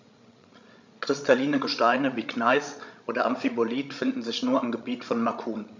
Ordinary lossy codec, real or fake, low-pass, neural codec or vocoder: MP3, 64 kbps; fake; 7.2 kHz; codec, 16 kHz, 16 kbps, FreqCodec, larger model